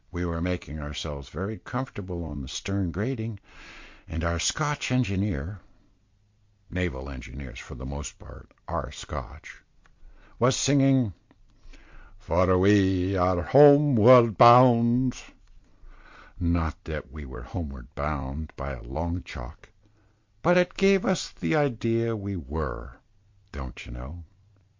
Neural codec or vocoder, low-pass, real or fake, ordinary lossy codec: none; 7.2 kHz; real; MP3, 48 kbps